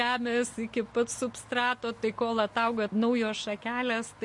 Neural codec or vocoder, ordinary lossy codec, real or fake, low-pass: none; MP3, 48 kbps; real; 10.8 kHz